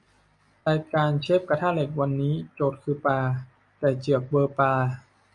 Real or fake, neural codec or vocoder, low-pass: real; none; 10.8 kHz